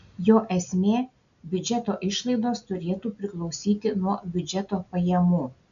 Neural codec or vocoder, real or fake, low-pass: none; real; 7.2 kHz